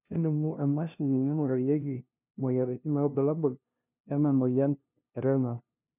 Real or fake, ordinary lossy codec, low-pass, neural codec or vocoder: fake; none; 3.6 kHz; codec, 16 kHz, 0.5 kbps, FunCodec, trained on LibriTTS, 25 frames a second